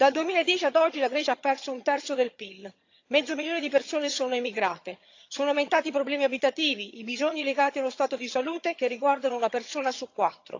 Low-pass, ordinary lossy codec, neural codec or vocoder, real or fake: 7.2 kHz; AAC, 48 kbps; vocoder, 22.05 kHz, 80 mel bands, HiFi-GAN; fake